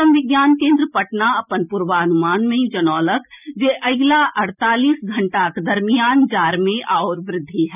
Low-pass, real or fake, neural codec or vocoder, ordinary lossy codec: 3.6 kHz; real; none; none